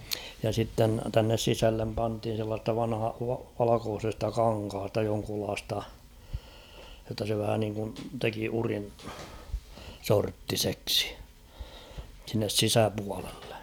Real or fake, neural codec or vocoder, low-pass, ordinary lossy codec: real; none; none; none